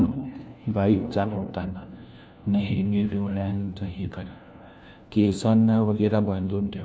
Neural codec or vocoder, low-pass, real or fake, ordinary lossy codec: codec, 16 kHz, 1 kbps, FunCodec, trained on LibriTTS, 50 frames a second; none; fake; none